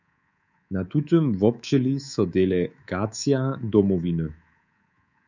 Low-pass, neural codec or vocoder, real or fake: 7.2 kHz; codec, 24 kHz, 3.1 kbps, DualCodec; fake